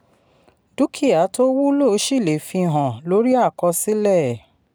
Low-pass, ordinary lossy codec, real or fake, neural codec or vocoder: none; none; real; none